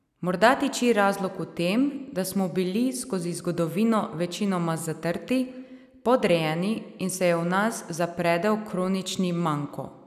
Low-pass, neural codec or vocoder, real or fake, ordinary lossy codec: 14.4 kHz; none; real; none